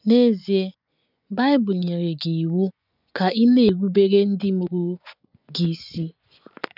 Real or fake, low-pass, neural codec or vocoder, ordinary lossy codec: fake; 5.4 kHz; vocoder, 24 kHz, 100 mel bands, Vocos; none